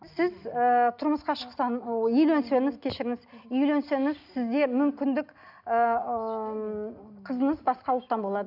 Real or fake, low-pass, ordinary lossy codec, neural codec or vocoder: real; 5.4 kHz; none; none